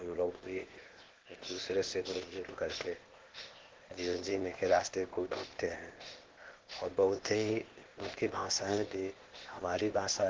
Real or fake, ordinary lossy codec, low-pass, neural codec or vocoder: fake; Opus, 16 kbps; 7.2 kHz; codec, 16 kHz, 0.8 kbps, ZipCodec